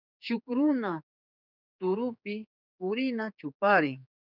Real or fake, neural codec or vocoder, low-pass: fake; codec, 16 kHz, 4 kbps, X-Codec, HuBERT features, trained on general audio; 5.4 kHz